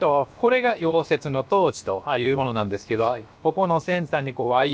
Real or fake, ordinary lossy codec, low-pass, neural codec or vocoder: fake; none; none; codec, 16 kHz, 0.7 kbps, FocalCodec